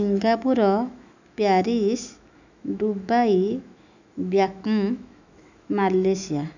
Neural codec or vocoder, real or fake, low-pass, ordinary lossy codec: autoencoder, 48 kHz, 128 numbers a frame, DAC-VAE, trained on Japanese speech; fake; 7.2 kHz; none